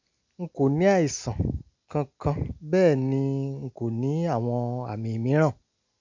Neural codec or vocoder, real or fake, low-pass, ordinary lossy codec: none; real; 7.2 kHz; MP3, 64 kbps